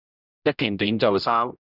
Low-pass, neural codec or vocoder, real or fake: 5.4 kHz; codec, 16 kHz, 0.5 kbps, X-Codec, HuBERT features, trained on general audio; fake